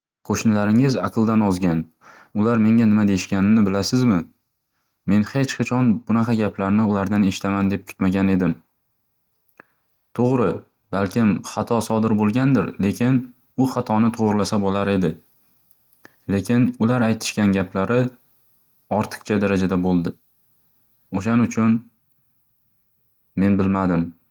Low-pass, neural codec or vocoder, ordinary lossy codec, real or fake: 19.8 kHz; none; Opus, 24 kbps; real